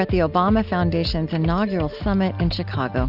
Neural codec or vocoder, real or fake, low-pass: none; real; 5.4 kHz